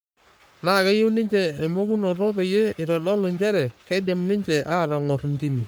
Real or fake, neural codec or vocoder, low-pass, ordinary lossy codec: fake; codec, 44.1 kHz, 3.4 kbps, Pupu-Codec; none; none